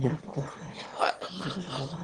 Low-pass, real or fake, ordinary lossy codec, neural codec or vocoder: 9.9 kHz; fake; Opus, 16 kbps; autoencoder, 22.05 kHz, a latent of 192 numbers a frame, VITS, trained on one speaker